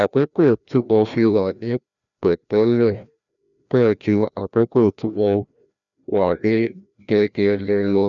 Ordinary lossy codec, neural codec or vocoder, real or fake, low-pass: none; codec, 16 kHz, 1 kbps, FreqCodec, larger model; fake; 7.2 kHz